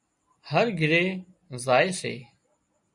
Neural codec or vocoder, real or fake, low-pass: none; real; 10.8 kHz